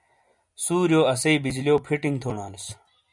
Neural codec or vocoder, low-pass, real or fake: none; 10.8 kHz; real